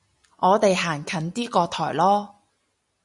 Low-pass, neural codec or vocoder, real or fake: 10.8 kHz; none; real